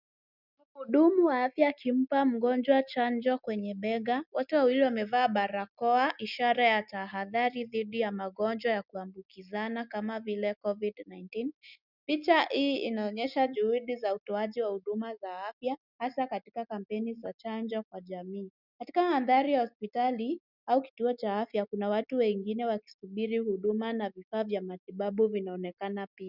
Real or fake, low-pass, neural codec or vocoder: real; 5.4 kHz; none